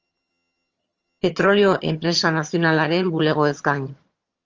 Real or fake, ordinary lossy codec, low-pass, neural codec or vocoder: fake; Opus, 24 kbps; 7.2 kHz; vocoder, 22.05 kHz, 80 mel bands, HiFi-GAN